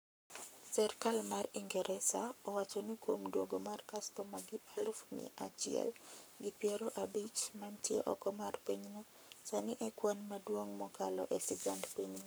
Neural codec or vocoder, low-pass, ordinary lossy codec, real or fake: codec, 44.1 kHz, 7.8 kbps, Pupu-Codec; none; none; fake